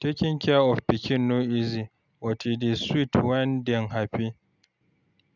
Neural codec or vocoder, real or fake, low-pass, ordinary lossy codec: none; real; 7.2 kHz; none